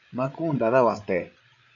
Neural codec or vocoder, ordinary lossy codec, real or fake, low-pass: codec, 16 kHz, 8 kbps, FreqCodec, larger model; AAC, 64 kbps; fake; 7.2 kHz